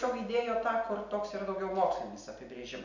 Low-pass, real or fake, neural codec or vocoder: 7.2 kHz; real; none